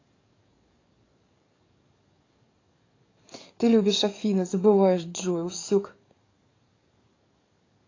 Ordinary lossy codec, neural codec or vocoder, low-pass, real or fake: AAC, 32 kbps; codec, 44.1 kHz, 7.8 kbps, DAC; 7.2 kHz; fake